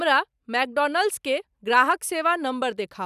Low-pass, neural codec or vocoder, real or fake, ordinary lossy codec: 14.4 kHz; none; real; none